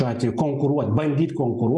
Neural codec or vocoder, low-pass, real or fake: none; 10.8 kHz; real